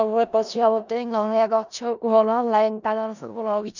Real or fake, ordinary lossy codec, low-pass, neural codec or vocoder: fake; none; 7.2 kHz; codec, 16 kHz in and 24 kHz out, 0.4 kbps, LongCat-Audio-Codec, four codebook decoder